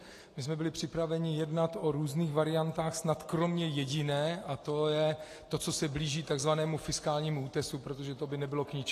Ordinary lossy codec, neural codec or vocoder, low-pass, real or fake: AAC, 48 kbps; none; 14.4 kHz; real